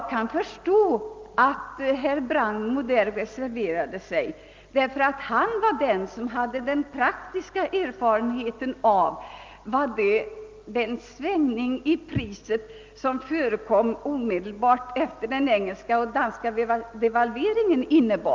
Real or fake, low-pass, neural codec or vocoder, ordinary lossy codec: real; 7.2 kHz; none; Opus, 24 kbps